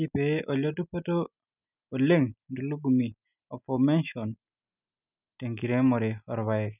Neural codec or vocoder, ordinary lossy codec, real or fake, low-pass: none; none; real; 3.6 kHz